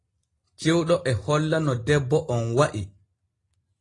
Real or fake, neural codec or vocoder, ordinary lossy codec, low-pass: real; none; AAC, 32 kbps; 10.8 kHz